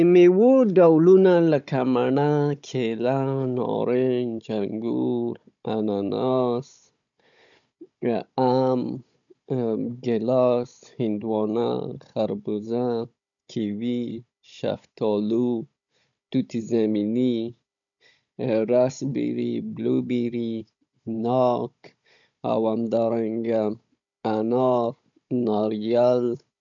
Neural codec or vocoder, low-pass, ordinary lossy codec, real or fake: codec, 16 kHz, 16 kbps, FunCodec, trained on Chinese and English, 50 frames a second; 7.2 kHz; none; fake